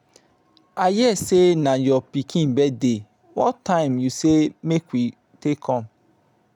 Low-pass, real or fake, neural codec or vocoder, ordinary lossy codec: 19.8 kHz; real; none; none